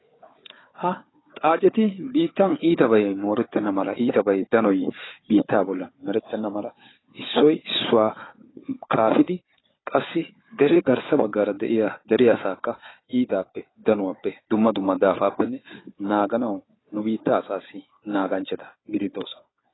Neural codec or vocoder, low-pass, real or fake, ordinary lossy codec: codec, 16 kHz, 4 kbps, FunCodec, trained on Chinese and English, 50 frames a second; 7.2 kHz; fake; AAC, 16 kbps